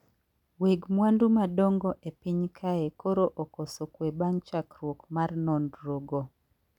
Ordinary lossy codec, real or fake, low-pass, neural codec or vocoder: none; real; 19.8 kHz; none